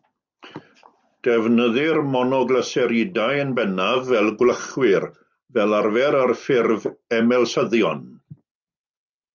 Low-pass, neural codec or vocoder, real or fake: 7.2 kHz; none; real